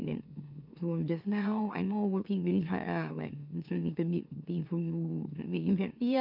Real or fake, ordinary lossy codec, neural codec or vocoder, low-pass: fake; none; autoencoder, 44.1 kHz, a latent of 192 numbers a frame, MeloTTS; 5.4 kHz